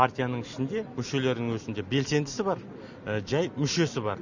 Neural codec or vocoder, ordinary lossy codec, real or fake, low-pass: none; none; real; 7.2 kHz